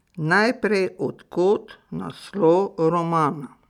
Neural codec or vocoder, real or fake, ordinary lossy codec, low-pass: none; real; none; 19.8 kHz